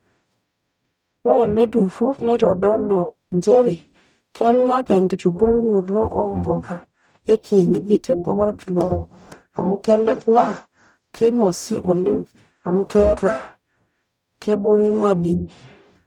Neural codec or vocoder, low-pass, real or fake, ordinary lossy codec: codec, 44.1 kHz, 0.9 kbps, DAC; 19.8 kHz; fake; none